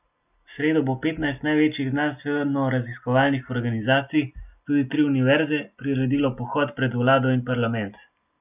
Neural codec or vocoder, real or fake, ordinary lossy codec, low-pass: none; real; none; 3.6 kHz